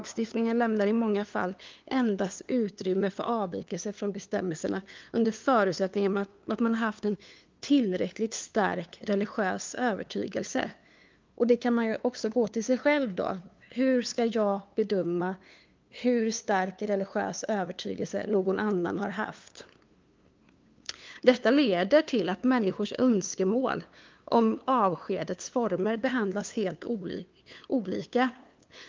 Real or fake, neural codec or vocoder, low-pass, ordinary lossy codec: fake; codec, 16 kHz, 2 kbps, FunCodec, trained on LibriTTS, 25 frames a second; 7.2 kHz; Opus, 24 kbps